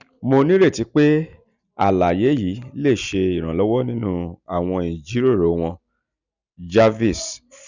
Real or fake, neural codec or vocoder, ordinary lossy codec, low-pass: real; none; none; 7.2 kHz